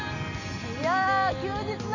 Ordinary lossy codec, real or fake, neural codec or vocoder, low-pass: none; real; none; 7.2 kHz